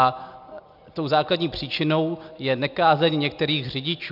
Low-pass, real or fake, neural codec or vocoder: 5.4 kHz; real; none